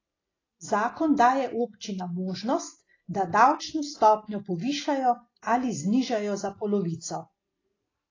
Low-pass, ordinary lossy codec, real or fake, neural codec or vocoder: 7.2 kHz; AAC, 32 kbps; real; none